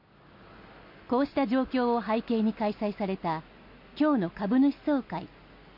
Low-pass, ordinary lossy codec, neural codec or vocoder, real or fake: 5.4 kHz; MP3, 32 kbps; none; real